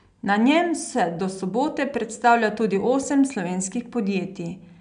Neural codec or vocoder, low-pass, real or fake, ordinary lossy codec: none; 9.9 kHz; real; none